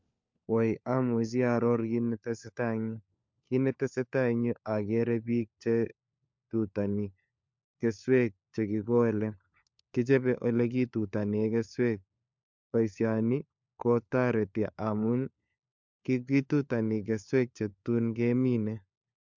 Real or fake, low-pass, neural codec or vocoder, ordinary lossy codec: fake; 7.2 kHz; codec, 16 kHz, 4 kbps, FunCodec, trained on LibriTTS, 50 frames a second; MP3, 64 kbps